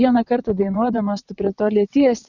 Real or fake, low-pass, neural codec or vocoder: fake; 7.2 kHz; vocoder, 22.05 kHz, 80 mel bands, WaveNeXt